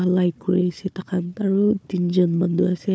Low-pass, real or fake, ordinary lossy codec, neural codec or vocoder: none; fake; none; codec, 16 kHz, 4 kbps, FunCodec, trained on Chinese and English, 50 frames a second